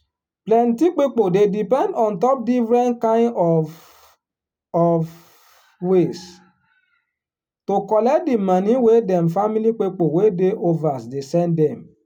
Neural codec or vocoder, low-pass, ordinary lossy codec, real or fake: none; 19.8 kHz; none; real